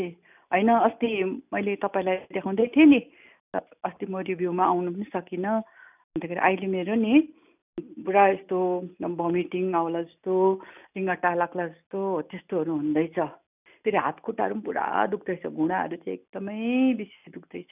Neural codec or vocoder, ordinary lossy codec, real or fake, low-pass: none; none; real; 3.6 kHz